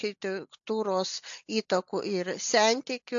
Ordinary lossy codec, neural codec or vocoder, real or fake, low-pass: AAC, 64 kbps; none; real; 7.2 kHz